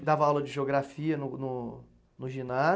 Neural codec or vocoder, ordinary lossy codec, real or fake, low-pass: none; none; real; none